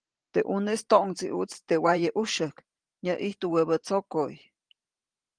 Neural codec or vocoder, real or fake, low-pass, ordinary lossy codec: vocoder, 44.1 kHz, 128 mel bands every 512 samples, BigVGAN v2; fake; 9.9 kHz; Opus, 24 kbps